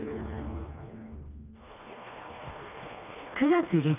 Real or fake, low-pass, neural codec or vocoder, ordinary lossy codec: fake; 3.6 kHz; codec, 16 kHz, 2 kbps, FreqCodec, smaller model; none